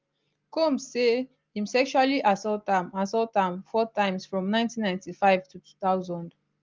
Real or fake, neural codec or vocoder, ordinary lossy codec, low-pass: real; none; Opus, 32 kbps; 7.2 kHz